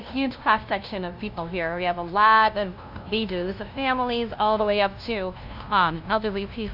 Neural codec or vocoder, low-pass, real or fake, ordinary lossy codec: codec, 16 kHz, 0.5 kbps, FunCodec, trained on LibriTTS, 25 frames a second; 5.4 kHz; fake; AAC, 48 kbps